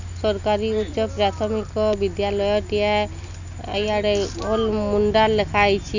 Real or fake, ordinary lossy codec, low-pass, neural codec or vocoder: real; none; 7.2 kHz; none